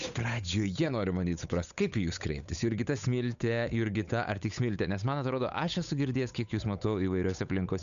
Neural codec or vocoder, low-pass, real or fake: codec, 16 kHz, 8 kbps, FunCodec, trained on Chinese and English, 25 frames a second; 7.2 kHz; fake